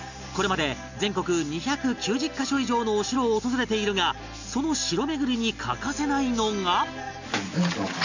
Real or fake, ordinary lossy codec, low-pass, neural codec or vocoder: real; none; 7.2 kHz; none